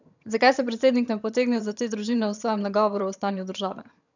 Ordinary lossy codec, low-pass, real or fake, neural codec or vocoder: none; 7.2 kHz; fake; vocoder, 22.05 kHz, 80 mel bands, HiFi-GAN